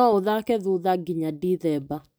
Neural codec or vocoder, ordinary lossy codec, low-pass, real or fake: none; none; none; real